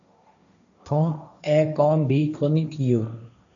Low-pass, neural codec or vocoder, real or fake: 7.2 kHz; codec, 16 kHz, 1.1 kbps, Voila-Tokenizer; fake